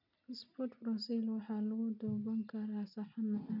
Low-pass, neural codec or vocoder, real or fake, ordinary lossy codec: 5.4 kHz; none; real; none